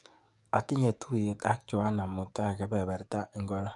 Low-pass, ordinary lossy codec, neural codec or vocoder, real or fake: 10.8 kHz; none; codec, 44.1 kHz, 7.8 kbps, DAC; fake